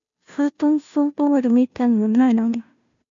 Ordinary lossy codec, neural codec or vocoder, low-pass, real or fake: MP3, 64 kbps; codec, 16 kHz, 0.5 kbps, FunCodec, trained on Chinese and English, 25 frames a second; 7.2 kHz; fake